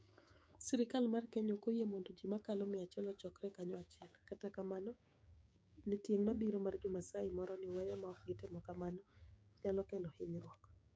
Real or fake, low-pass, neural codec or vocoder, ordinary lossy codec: fake; none; codec, 16 kHz, 6 kbps, DAC; none